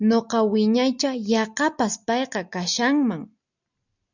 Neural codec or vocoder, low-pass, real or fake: none; 7.2 kHz; real